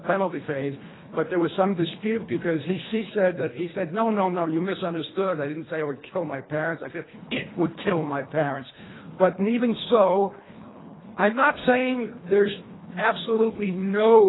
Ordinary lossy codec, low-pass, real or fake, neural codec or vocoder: AAC, 16 kbps; 7.2 kHz; fake; codec, 24 kHz, 1.5 kbps, HILCodec